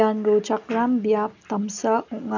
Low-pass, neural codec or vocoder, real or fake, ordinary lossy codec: 7.2 kHz; none; real; none